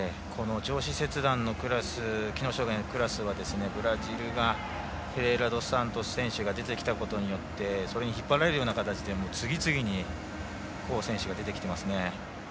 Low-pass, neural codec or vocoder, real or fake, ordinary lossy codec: none; none; real; none